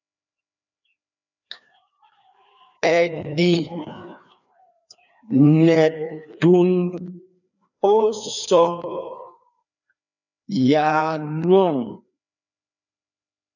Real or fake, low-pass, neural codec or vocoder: fake; 7.2 kHz; codec, 16 kHz, 2 kbps, FreqCodec, larger model